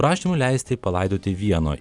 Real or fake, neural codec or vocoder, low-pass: fake; vocoder, 44.1 kHz, 128 mel bands every 256 samples, BigVGAN v2; 10.8 kHz